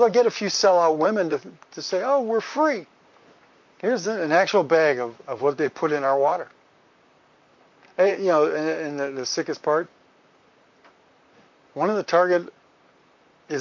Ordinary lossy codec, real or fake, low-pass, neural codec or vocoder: MP3, 48 kbps; fake; 7.2 kHz; vocoder, 44.1 kHz, 128 mel bands, Pupu-Vocoder